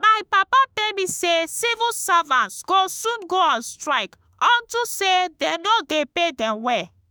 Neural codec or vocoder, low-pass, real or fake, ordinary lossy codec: autoencoder, 48 kHz, 32 numbers a frame, DAC-VAE, trained on Japanese speech; none; fake; none